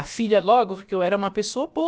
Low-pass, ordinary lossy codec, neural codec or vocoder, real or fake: none; none; codec, 16 kHz, about 1 kbps, DyCAST, with the encoder's durations; fake